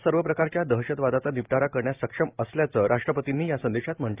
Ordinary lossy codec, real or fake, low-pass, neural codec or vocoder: Opus, 32 kbps; real; 3.6 kHz; none